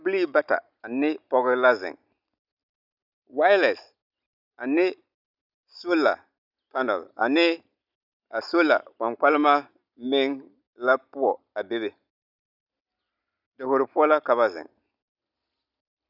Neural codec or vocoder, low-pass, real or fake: none; 5.4 kHz; real